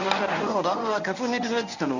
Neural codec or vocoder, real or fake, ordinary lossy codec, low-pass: codec, 24 kHz, 0.9 kbps, WavTokenizer, medium speech release version 1; fake; none; 7.2 kHz